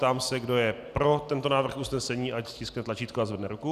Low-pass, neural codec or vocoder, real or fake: 14.4 kHz; none; real